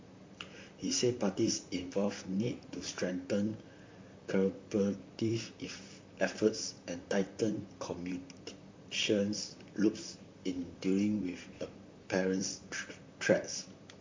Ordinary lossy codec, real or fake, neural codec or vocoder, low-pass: MP3, 48 kbps; fake; vocoder, 44.1 kHz, 128 mel bands, Pupu-Vocoder; 7.2 kHz